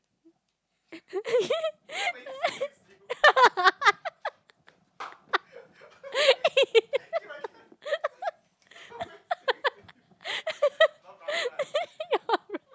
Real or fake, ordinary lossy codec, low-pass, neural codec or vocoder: real; none; none; none